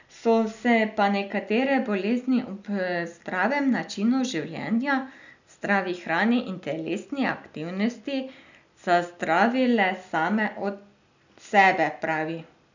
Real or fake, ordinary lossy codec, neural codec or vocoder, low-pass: real; none; none; 7.2 kHz